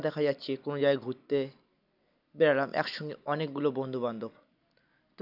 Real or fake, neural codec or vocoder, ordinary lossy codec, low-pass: real; none; none; 5.4 kHz